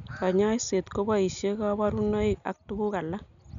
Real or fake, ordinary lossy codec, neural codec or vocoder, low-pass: real; none; none; 7.2 kHz